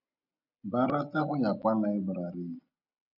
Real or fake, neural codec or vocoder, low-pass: real; none; 5.4 kHz